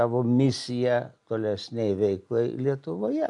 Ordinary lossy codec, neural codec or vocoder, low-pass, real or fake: AAC, 64 kbps; none; 10.8 kHz; real